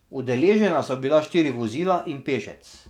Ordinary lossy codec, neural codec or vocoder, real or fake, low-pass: none; codec, 44.1 kHz, 7.8 kbps, DAC; fake; 19.8 kHz